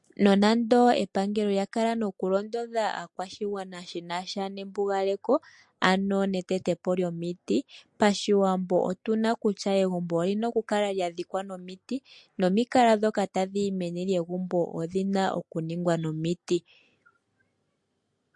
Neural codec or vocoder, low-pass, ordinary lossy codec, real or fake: none; 10.8 kHz; MP3, 48 kbps; real